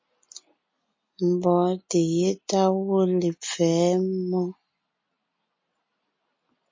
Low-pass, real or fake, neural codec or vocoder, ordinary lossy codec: 7.2 kHz; real; none; MP3, 32 kbps